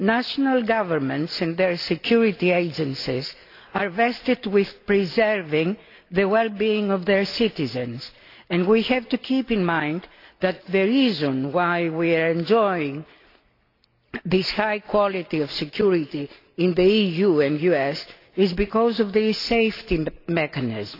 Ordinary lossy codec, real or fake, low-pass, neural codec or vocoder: AAC, 32 kbps; real; 5.4 kHz; none